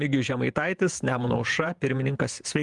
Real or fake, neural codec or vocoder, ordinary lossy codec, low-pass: fake; vocoder, 48 kHz, 128 mel bands, Vocos; Opus, 32 kbps; 10.8 kHz